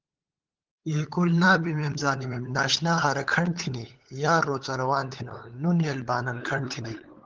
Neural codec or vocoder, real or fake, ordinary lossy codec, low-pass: codec, 16 kHz, 8 kbps, FunCodec, trained on LibriTTS, 25 frames a second; fake; Opus, 16 kbps; 7.2 kHz